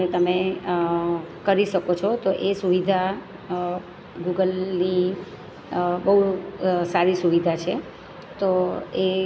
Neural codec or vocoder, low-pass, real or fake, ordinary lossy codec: none; none; real; none